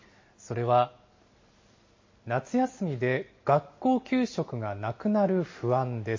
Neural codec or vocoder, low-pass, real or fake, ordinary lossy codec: none; 7.2 kHz; real; MP3, 32 kbps